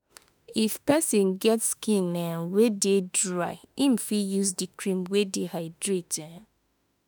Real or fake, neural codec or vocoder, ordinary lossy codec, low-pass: fake; autoencoder, 48 kHz, 32 numbers a frame, DAC-VAE, trained on Japanese speech; none; none